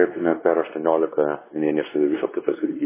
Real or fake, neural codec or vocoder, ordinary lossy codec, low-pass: fake; codec, 16 kHz, 2 kbps, X-Codec, WavLM features, trained on Multilingual LibriSpeech; MP3, 16 kbps; 3.6 kHz